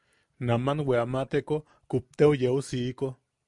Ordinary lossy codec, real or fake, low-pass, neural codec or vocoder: MP3, 96 kbps; real; 10.8 kHz; none